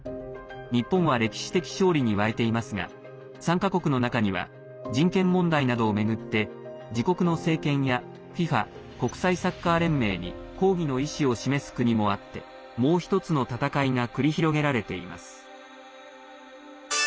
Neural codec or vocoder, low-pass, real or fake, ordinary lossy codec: none; none; real; none